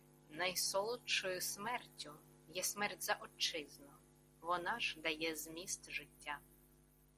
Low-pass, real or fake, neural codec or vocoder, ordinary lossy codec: 14.4 kHz; real; none; Opus, 64 kbps